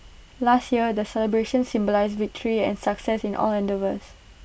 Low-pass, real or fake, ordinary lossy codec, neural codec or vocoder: none; real; none; none